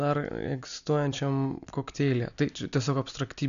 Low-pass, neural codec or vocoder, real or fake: 7.2 kHz; none; real